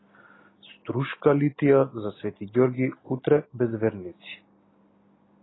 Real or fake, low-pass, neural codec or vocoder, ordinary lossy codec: real; 7.2 kHz; none; AAC, 16 kbps